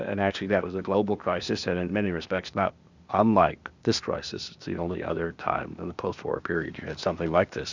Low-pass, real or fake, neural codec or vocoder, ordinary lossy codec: 7.2 kHz; fake; codec, 16 kHz, 0.8 kbps, ZipCodec; Opus, 64 kbps